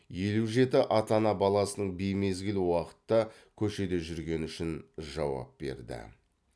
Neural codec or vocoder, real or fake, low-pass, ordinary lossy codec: none; real; none; none